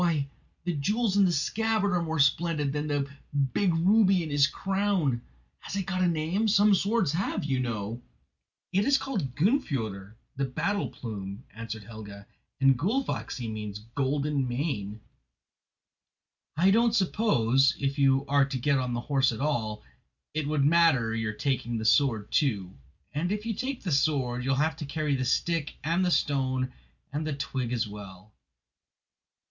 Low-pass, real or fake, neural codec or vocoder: 7.2 kHz; real; none